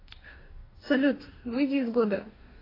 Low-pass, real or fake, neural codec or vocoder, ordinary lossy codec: 5.4 kHz; fake; codec, 44.1 kHz, 2.6 kbps, DAC; AAC, 24 kbps